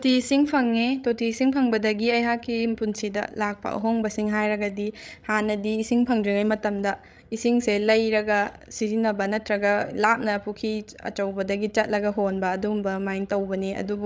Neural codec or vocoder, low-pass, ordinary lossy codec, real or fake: codec, 16 kHz, 16 kbps, FreqCodec, larger model; none; none; fake